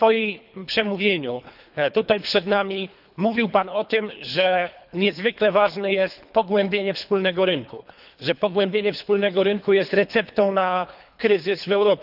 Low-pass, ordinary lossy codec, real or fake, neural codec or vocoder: 5.4 kHz; none; fake; codec, 24 kHz, 3 kbps, HILCodec